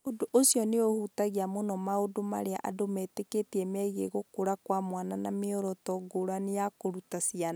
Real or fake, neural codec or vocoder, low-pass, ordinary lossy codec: real; none; none; none